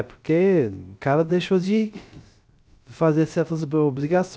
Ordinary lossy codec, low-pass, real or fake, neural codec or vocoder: none; none; fake; codec, 16 kHz, 0.3 kbps, FocalCodec